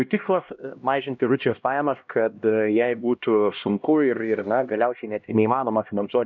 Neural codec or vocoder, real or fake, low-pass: codec, 16 kHz, 1 kbps, X-Codec, HuBERT features, trained on LibriSpeech; fake; 7.2 kHz